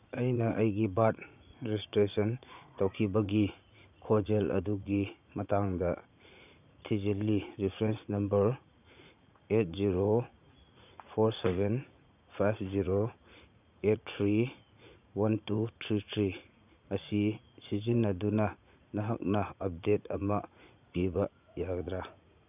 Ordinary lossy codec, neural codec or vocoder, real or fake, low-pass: none; vocoder, 44.1 kHz, 80 mel bands, Vocos; fake; 3.6 kHz